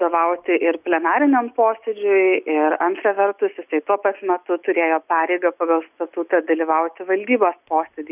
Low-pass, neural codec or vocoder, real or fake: 3.6 kHz; none; real